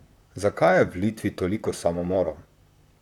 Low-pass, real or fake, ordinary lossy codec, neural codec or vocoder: 19.8 kHz; fake; none; vocoder, 44.1 kHz, 128 mel bands, Pupu-Vocoder